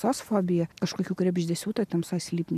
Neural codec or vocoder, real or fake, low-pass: none; real; 14.4 kHz